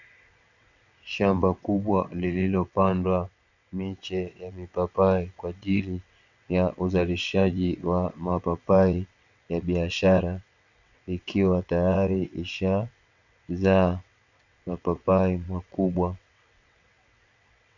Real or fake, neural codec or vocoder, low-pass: fake; vocoder, 22.05 kHz, 80 mel bands, WaveNeXt; 7.2 kHz